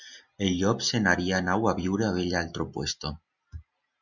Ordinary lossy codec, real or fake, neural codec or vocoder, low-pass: Opus, 64 kbps; real; none; 7.2 kHz